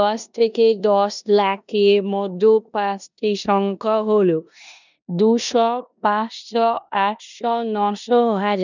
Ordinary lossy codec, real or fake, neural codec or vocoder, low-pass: none; fake; codec, 16 kHz in and 24 kHz out, 0.9 kbps, LongCat-Audio-Codec, four codebook decoder; 7.2 kHz